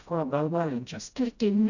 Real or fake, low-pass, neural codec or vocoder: fake; 7.2 kHz; codec, 16 kHz, 0.5 kbps, FreqCodec, smaller model